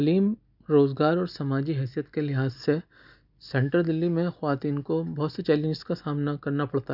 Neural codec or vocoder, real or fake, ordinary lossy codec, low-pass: none; real; none; 5.4 kHz